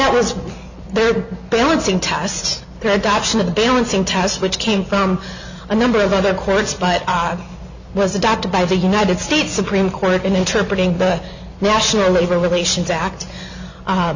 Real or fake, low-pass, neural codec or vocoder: real; 7.2 kHz; none